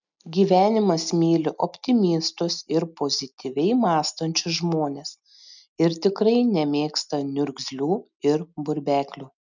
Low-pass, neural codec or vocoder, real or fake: 7.2 kHz; none; real